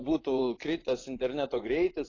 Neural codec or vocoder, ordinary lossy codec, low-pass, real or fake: vocoder, 24 kHz, 100 mel bands, Vocos; AAC, 32 kbps; 7.2 kHz; fake